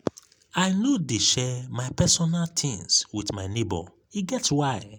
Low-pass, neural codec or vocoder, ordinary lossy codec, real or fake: 19.8 kHz; none; none; real